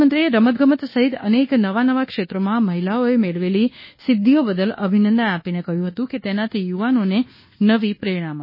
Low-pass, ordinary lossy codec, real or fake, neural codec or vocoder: 5.4 kHz; MP3, 24 kbps; fake; codec, 24 kHz, 1.2 kbps, DualCodec